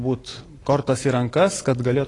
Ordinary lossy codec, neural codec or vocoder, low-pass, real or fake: AAC, 32 kbps; none; 10.8 kHz; real